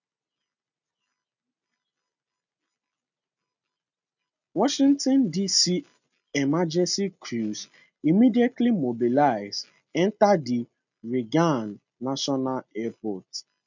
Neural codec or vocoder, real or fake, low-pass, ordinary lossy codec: none; real; 7.2 kHz; none